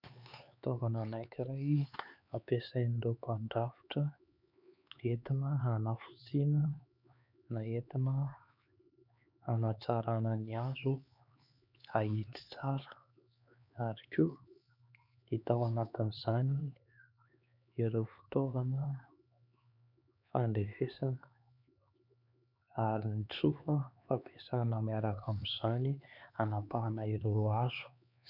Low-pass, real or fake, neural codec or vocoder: 5.4 kHz; fake; codec, 16 kHz, 4 kbps, X-Codec, HuBERT features, trained on LibriSpeech